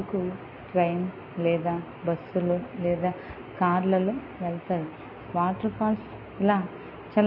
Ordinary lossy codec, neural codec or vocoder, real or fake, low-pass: MP3, 24 kbps; none; real; 5.4 kHz